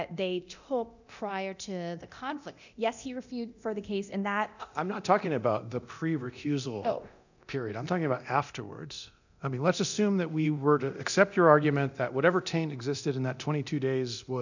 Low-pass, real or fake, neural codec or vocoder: 7.2 kHz; fake; codec, 24 kHz, 0.9 kbps, DualCodec